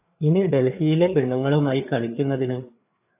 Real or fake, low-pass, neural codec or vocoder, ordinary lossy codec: fake; 3.6 kHz; codec, 16 kHz, 4 kbps, FreqCodec, larger model; AAC, 32 kbps